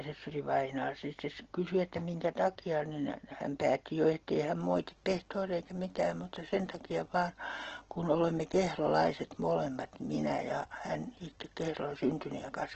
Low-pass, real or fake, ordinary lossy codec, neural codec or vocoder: 7.2 kHz; real; Opus, 16 kbps; none